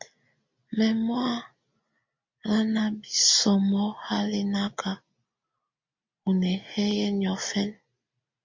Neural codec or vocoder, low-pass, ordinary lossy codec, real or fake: none; 7.2 kHz; MP3, 48 kbps; real